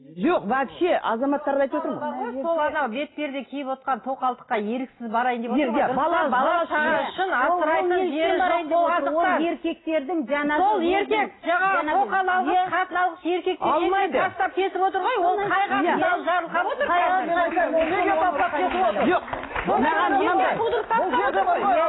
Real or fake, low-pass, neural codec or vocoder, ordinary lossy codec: real; 7.2 kHz; none; AAC, 16 kbps